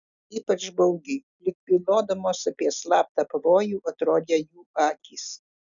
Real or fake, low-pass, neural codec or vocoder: real; 7.2 kHz; none